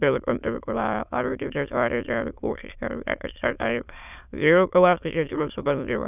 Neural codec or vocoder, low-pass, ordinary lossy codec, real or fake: autoencoder, 22.05 kHz, a latent of 192 numbers a frame, VITS, trained on many speakers; 3.6 kHz; none; fake